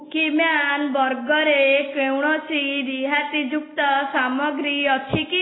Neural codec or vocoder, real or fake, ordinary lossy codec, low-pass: none; real; AAC, 16 kbps; 7.2 kHz